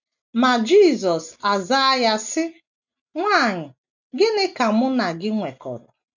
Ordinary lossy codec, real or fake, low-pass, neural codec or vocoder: none; real; 7.2 kHz; none